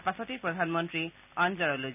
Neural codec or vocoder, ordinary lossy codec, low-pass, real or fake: none; none; 3.6 kHz; real